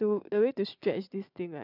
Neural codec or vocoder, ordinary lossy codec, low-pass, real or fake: vocoder, 22.05 kHz, 80 mel bands, Vocos; none; 5.4 kHz; fake